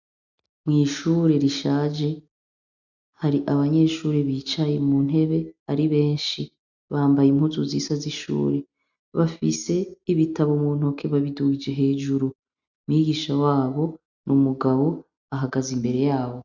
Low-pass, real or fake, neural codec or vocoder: 7.2 kHz; real; none